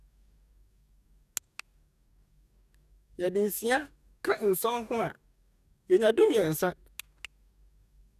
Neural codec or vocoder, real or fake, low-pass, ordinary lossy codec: codec, 44.1 kHz, 2.6 kbps, DAC; fake; 14.4 kHz; none